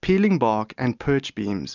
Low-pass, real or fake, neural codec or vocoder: 7.2 kHz; real; none